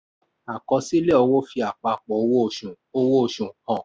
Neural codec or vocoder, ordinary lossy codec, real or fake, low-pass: none; none; real; none